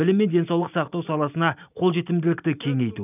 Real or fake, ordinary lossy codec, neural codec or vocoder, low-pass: real; none; none; 3.6 kHz